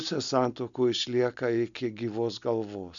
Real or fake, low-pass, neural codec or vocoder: real; 7.2 kHz; none